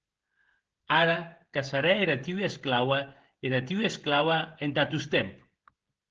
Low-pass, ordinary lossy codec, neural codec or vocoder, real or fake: 7.2 kHz; Opus, 16 kbps; codec, 16 kHz, 16 kbps, FreqCodec, smaller model; fake